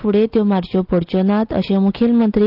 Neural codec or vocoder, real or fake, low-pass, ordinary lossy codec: none; real; 5.4 kHz; Opus, 32 kbps